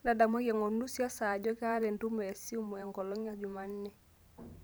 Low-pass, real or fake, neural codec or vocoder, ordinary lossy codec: none; fake; vocoder, 44.1 kHz, 128 mel bands every 512 samples, BigVGAN v2; none